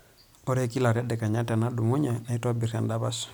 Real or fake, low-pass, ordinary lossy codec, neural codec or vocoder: real; none; none; none